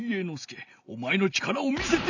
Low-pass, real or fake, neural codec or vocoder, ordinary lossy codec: 7.2 kHz; real; none; none